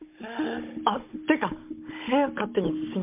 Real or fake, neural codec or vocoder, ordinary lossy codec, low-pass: fake; codec, 16 kHz, 16 kbps, FunCodec, trained on Chinese and English, 50 frames a second; MP3, 32 kbps; 3.6 kHz